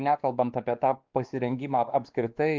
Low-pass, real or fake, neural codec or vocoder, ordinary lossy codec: 7.2 kHz; fake; codec, 16 kHz, 2 kbps, X-Codec, WavLM features, trained on Multilingual LibriSpeech; Opus, 24 kbps